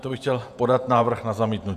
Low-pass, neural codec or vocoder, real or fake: 14.4 kHz; vocoder, 48 kHz, 128 mel bands, Vocos; fake